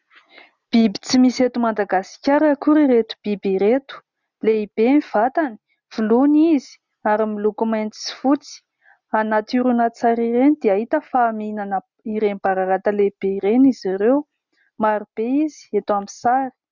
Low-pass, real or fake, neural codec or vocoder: 7.2 kHz; real; none